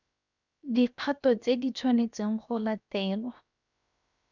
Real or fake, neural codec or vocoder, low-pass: fake; codec, 16 kHz, 0.7 kbps, FocalCodec; 7.2 kHz